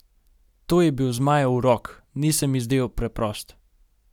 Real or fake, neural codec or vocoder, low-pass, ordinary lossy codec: real; none; 19.8 kHz; none